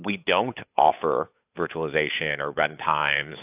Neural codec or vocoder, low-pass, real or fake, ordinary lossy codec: codec, 16 kHz, 8 kbps, FunCodec, trained on Chinese and English, 25 frames a second; 3.6 kHz; fake; AAC, 32 kbps